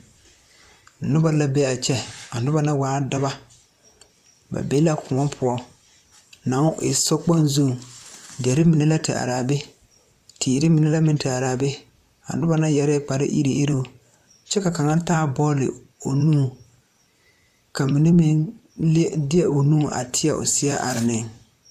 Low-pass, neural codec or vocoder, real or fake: 14.4 kHz; vocoder, 44.1 kHz, 128 mel bands, Pupu-Vocoder; fake